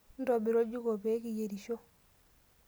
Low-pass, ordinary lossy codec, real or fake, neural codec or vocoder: none; none; real; none